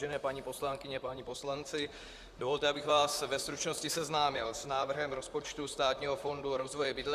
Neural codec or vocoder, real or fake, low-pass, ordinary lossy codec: vocoder, 44.1 kHz, 128 mel bands, Pupu-Vocoder; fake; 14.4 kHz; Opus, 64 kbps